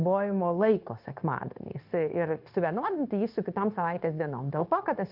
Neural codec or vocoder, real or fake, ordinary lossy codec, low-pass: codec, 16 kHz, 0.9 kbps, LongCat-Audio-Codec; fake; Opus, 24 kbps; 5.4 kHz